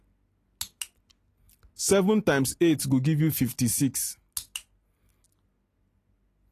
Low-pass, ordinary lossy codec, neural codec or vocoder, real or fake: 14.4 kHz; AAC, 48 kbps; none; real